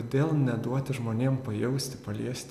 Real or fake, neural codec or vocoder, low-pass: real; none; 14.4 kHz